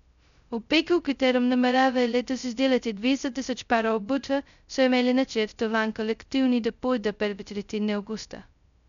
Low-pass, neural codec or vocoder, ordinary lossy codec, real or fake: 7.2 kHz; codec, 16 kHz, 0.2 kbps, FocalCodec; none; fake